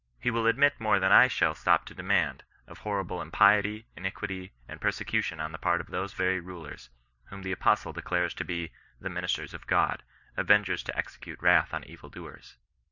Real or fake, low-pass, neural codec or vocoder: real; 7.2 kHz; none